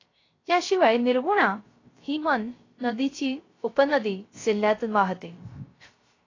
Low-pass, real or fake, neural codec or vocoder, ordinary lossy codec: 7.2 kHz; fake; codec, 16 kHz, 0.3 kbps, FocalCodec; AAC, 32 kbps